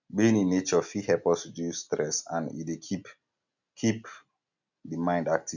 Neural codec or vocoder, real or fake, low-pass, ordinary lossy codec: none; real; 7.2 kHz; none